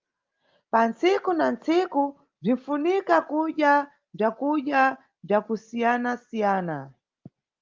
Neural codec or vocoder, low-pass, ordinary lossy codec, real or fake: none; 7.2 kHz; Opus, 32 kbps; real